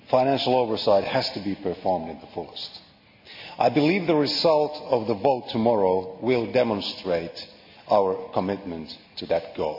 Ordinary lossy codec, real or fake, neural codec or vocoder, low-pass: MP3, 32 kbps; real; none; 5.4 kHz